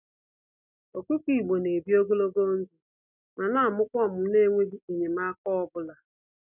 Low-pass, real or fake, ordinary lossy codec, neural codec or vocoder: 3.6 kHz; real; none; none